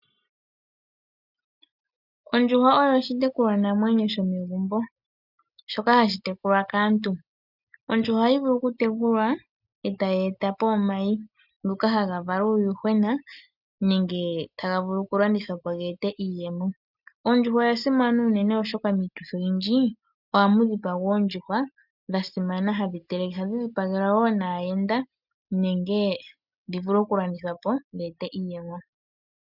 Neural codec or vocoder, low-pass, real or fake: none; 5.4 kHz; real